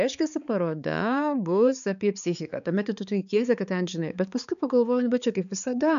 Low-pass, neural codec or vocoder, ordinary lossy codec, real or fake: 7.2 kHz; codec, 16 kHz, 4 kbps, X-Codec, HuBERT features, trained on balanced general audio; MP3, 96 kbps; fake